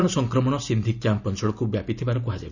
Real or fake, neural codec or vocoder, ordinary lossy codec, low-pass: real; none; none; 7.2 kHz